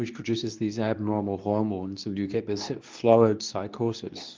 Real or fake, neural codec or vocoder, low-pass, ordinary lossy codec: fake; codec, 24 kHz, 0.9 kbps, WavTokenizer, medium speech release version 2; 7.2 kHz; Opus, 24 kbps